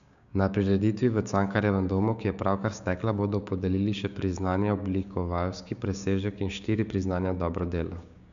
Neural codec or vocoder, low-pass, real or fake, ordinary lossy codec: codec, 16 kHz, 6 kbps, DAC; 7.2 kHz; fake; none